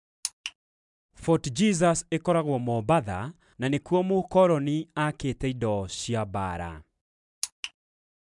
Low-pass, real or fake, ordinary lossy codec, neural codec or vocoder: 10.8 kHz; real; MP3, 96 kbps; none